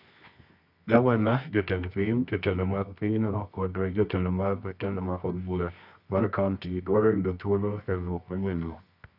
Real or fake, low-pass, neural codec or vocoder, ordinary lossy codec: fake; 5.4 kHz; codec, 24 kHz, 0.9 kbps, WavTokenizer, medium music audio release; none